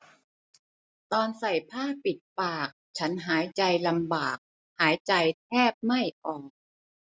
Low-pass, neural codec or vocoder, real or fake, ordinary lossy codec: none; none; real; none